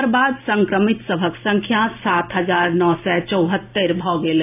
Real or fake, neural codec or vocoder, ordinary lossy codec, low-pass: real; none; AAC, 24 kbps; 3.6 kHz